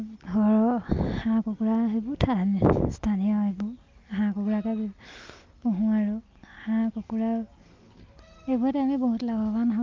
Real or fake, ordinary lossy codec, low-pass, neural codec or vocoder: real; Opus, 24 kbps; 7.2 kHz; none